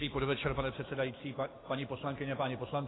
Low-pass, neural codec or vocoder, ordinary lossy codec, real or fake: 7.2 kHz; none; AAC, 16 kbps; real